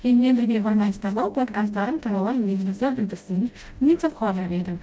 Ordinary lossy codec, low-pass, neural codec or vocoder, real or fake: none; none; codec, 16 kHz, 0.5 kbps, FreqCodec, smaller model; fake